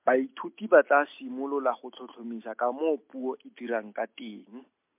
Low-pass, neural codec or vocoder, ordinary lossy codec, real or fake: 3.6 kHz; none; MP3, 32 kbps; real